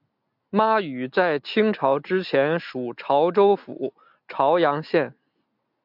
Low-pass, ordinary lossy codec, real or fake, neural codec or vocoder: 5.4 kHz; MP3, 48 kbps; real; none